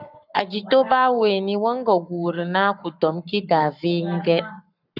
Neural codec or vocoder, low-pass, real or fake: codec, 44.1 kHz, 7.8 kbps, Pupu-Codec; 5.4 kHz; fake